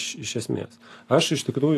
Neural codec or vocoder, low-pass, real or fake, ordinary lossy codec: none; 14.4 kHz; real; AAC, 64 kbps